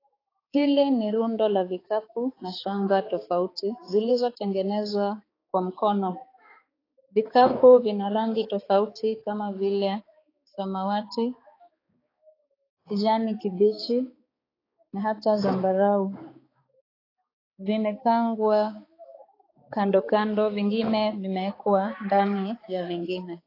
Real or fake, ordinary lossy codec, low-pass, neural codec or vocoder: fake; AAC, 24 kbps; 5.4 kHz; codec, 16 kHz, 4 kbps, X-Codec, HuBERT features, trained on balanced general audio